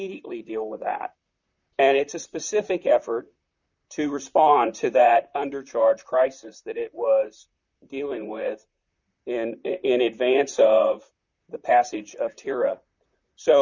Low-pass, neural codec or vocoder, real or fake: 7.2 kHz; vocoder, 44.1 kHz, 128 mel bands, Pupu-Vocoder; fake